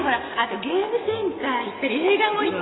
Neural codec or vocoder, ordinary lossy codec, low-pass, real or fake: none; AAC, 16 kbps; 7.2 kHz; real